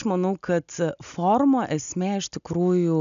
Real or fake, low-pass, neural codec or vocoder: real; 7.2 kHz; none